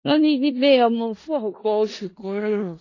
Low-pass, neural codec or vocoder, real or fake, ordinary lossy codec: 7.2 kHz; codec, 16 kHz in and 24 kHz out, 0.4 kbps, LongCat-Audio-Codec, four codebook decoder; fake; AAC, 32 kbps